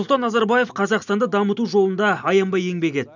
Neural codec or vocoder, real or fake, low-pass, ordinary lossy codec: none; real; 7.2 kHz; none